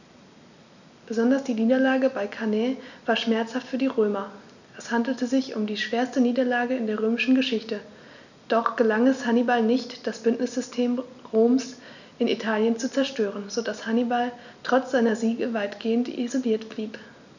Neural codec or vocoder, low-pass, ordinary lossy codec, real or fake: none; 7.2 kHz; none; real